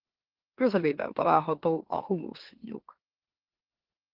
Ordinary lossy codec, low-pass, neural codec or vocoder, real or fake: Opus, 16 kbps; 5.4 kHz; autoencoder, 44.1 kHz, a latent of 192 numbers a frame, MeloTTS; fake